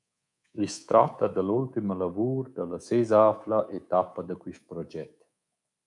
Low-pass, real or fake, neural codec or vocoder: 10.8 kHz; fake; codec, 24 kHz, 3.1 kbps, DualCodec